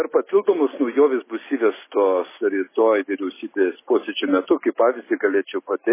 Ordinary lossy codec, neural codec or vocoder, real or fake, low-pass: MP3, 16 kbps; none; real; 3.6 kHz